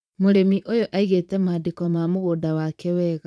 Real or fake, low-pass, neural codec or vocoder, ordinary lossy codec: fake; none; vocoder, 22.05 kHz, 80 mel bands, Vocos; none